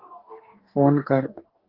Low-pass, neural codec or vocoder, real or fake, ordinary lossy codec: 5.4 kHz; codec, 44.1 kHz, 2.6 kbps, DAC; fake; Opus, 16 kbps